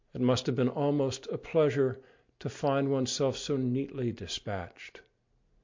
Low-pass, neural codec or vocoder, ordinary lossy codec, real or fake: 7.2 kHz; none; MP3, 48 kbps; real